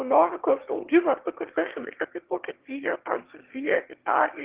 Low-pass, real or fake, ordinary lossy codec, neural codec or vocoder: 3.6 kHz; fake; Opus, 16 kbps; autoencoder, 22.05 kHz, a latent of 192 numbers a frame, VITS, trained on one speaker